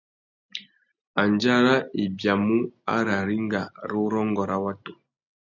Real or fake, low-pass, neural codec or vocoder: real; 7.2 kHz; none